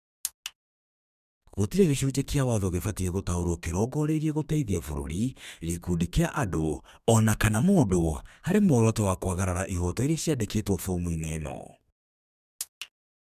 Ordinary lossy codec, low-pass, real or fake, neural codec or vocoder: none; 14.4 kHz; fake; codec, 32 kHz, 1.9 kbps, SNAC